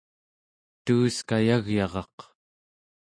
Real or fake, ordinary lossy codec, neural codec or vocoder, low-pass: real; MP3, 48 kbps; none; 9.9 kHz